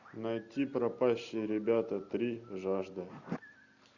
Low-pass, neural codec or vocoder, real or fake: 7.2 kHz; none; real